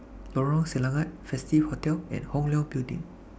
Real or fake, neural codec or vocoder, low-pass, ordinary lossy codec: real; none; none; none